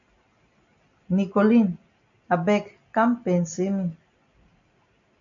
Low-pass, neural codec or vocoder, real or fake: 7.2 kHz; none; real